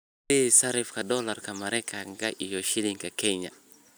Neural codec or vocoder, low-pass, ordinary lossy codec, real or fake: none; none; none; real